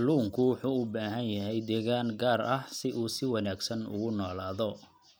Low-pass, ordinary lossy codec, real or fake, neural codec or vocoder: none; none; real; none